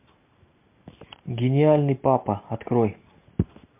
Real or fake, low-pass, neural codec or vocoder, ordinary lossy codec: real; 3.6 kHz; none; MP3, 32 kbps